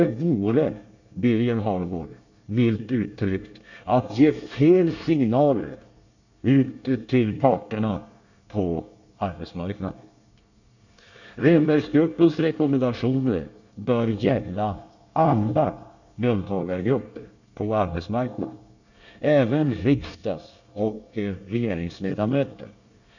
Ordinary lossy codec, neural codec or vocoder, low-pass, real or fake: none; codec, 24 kHz, 1 kbps, SNAC; 7.2 kHz; fake